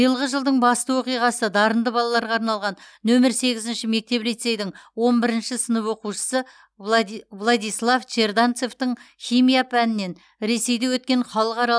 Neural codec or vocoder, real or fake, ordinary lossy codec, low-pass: none; real; none; none